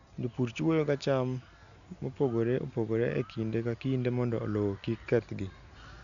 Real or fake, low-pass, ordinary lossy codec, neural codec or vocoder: real; 7.2 kHz; none; none